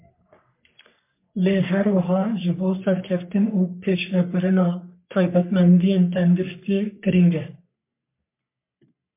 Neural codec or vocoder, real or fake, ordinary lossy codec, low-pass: codec, 44.1 kHz, 3.4 kbps, Pupu-Codec; fake; MP3, 32 kbps; 3.6 kHz